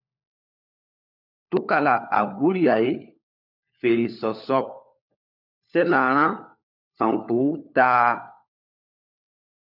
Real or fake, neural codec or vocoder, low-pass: fake; codec, 16 kHz, 4 kbps, FunCodec, trained on LibriTTS, 50 frames a second; 5.4 kHz